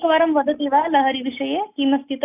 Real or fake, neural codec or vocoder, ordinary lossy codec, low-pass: real; none; none; 3.6 kHz